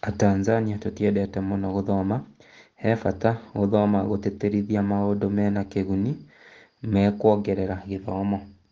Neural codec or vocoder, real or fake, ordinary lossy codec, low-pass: none; real; Opus, 16 kbps; 7.2 kHz